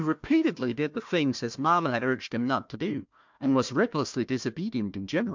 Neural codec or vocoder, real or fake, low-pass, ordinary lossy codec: codec, 16 kHz, 1 kbps, FunCodec, trained on Chinese and English, 50 frames a second; fake; 7.2 kHz; MP3, 64 kbps